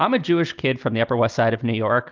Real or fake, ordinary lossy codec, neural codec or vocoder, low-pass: real; Opus, 32 kbps; none; 7.2 kHz